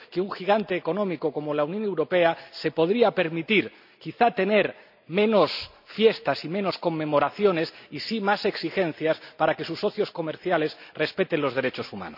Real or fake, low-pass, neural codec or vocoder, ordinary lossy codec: real; 5.4 kHz; none; none